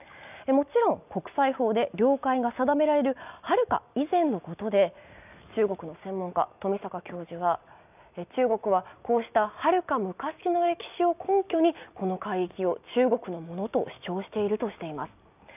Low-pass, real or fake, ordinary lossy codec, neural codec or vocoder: 3.6 kHz; real; none; none